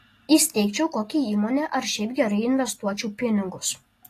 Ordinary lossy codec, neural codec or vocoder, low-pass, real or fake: AAC, 48 kbps; none; 14.4 kHz; real